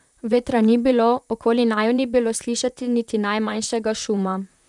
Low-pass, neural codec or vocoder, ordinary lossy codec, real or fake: 10.8 kHz; vocoder, 44.1 kHz, 128 mel bands, Pupu-Vocoder; none; fake